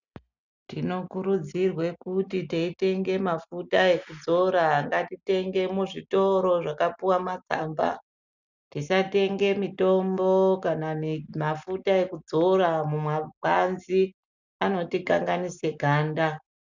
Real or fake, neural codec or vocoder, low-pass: real; none; 7.2 kHz